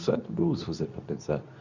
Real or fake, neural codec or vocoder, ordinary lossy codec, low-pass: fake; codec, 24 kHz, 0.9 kbps, WavTokenizer, medium speech release version 2; none; 7.2 kHz